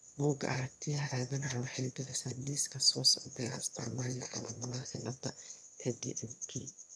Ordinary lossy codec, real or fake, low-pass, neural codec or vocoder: none; fake; none; autoencoder, 22.05 kHz, a latent of 192 numbers a frame, VITS, trained on one speaker